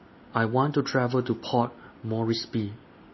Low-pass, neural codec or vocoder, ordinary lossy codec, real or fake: 7.2 kHz; none; MP3, 24 kbps; real